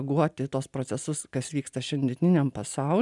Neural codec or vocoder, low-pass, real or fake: none; 10.8 kHz; real